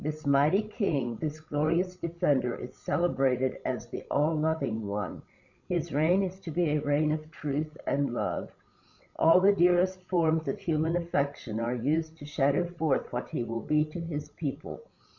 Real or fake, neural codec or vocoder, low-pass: fake; codec, 16 kHz, 16 kbps, FreqCodec, larger model; 7.2 kHz